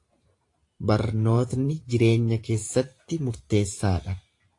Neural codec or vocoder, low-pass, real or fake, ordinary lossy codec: vocoder, 44.1 kHz, 128 mel bands every 512 samples, BigVGAN v2; 10.8 kHz; fake; AAC, 48 kbps